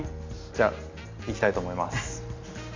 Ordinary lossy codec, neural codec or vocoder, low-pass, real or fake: MP3, 64 kbps; none; 7.2 kHz; real